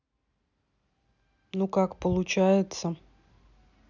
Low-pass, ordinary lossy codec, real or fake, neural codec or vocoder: 7.2 kHz; none; real; none